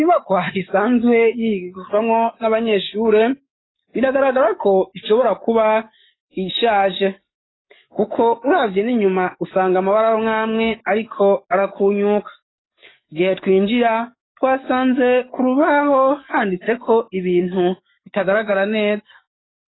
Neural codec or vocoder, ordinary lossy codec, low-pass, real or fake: codec, 44.1 kHz, 7.8 kbps, DAC; AAC, 16 kbps; 7.2 kHz; fake